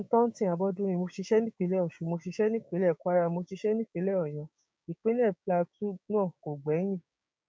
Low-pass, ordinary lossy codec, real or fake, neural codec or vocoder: none; none; fake; codec, 16 kHz, 6 kbps, DAC